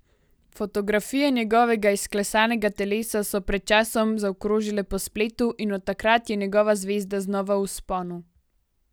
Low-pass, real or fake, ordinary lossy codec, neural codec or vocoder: none; real; none; none